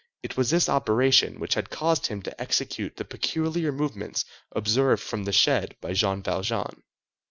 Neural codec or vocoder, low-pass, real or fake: none; 7.2 kHz; real